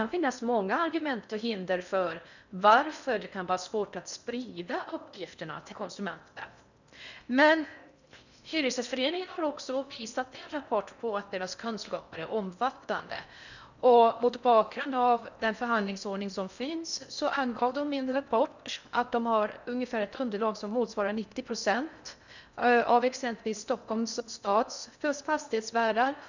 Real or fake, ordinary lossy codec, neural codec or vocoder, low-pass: fake; none; codec, 16 kHz in and 24 kHz out, 0.6 kbps, FocalCodec, streaming, 4096 codes; 7.2 kHz